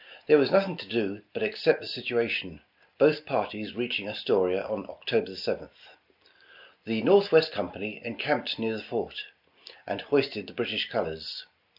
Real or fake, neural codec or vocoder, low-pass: real; none; 5.4 kHz